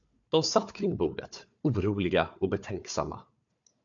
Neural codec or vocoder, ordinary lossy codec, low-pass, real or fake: codec, 16 kHz, 4 kbps, FunCodec, trained on Chinese and English, 50 frames a second; AAC, 64 kbps; 7.2 kHz; fake